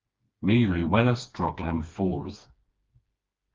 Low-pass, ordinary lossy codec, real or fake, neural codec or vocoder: 7.2 kHz; Opus, 24 kbps; fake; codec, 16 kHz, 2 kbps, FreqCodec, smaller model